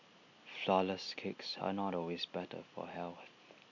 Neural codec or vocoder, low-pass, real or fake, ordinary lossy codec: none; 7.2 kHz; real; none